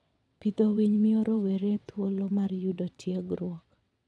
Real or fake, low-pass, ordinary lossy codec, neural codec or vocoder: fake; none; none; vocoder, 22.05 kHz, 80 mel bands, WaveNeXt